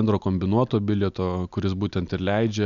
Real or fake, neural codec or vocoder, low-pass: real; none; 7.2 kHz